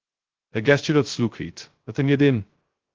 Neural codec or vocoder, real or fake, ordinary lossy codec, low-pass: codec, 16 kHz, 0.2 kbps, FocalCodec; fake; Opus, 16 kbps; 7.2 kHz